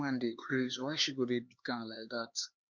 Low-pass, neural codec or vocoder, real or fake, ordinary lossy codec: 7.2 kHz; codec, 16 kHz, 4 kbps, X-Codec, HuBERT features, trained on LibriSpeech; fake; Opus, 64 kbps